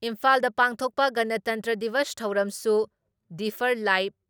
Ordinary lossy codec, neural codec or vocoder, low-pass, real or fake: none; none; none; real